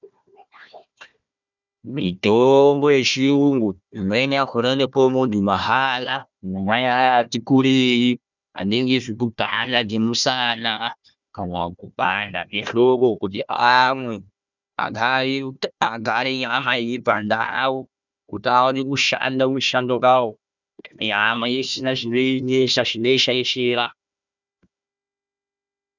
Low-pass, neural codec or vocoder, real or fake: 7.2 kHz; codec, 16 kHz, 1 kbps, FunCodec, trained on Chinese and English, 50 frames a second; fake